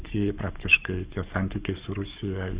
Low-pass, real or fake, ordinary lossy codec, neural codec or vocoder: 3.6 kHz; fake; Opus, 64 kbps; codec, 44.1 kHz, 7.8 kbps, Pupu-Codec